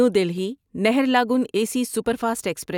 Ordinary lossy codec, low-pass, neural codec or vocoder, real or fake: none; 19.8 kHz; none; real